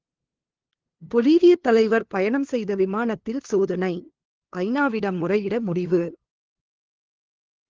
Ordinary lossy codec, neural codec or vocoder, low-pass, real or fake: Opus, 16 kbps; codec, 16 kHz, 2 kbps, FunCodec, trained on LibriTTS, 25 frames a second; 7.2 kHz; fake